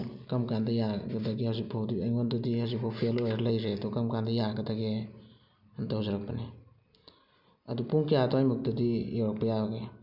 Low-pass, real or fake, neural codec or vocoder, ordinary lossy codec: 5.4 kHz; real; none; none